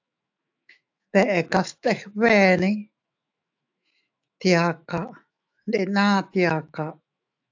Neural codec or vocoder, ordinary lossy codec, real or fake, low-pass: autoencoder, 48 kHz, 128 numbers a frame, DAC-VAE, trained on Japanese speech; AAC, 48 kbps; fake; 7.2 kHz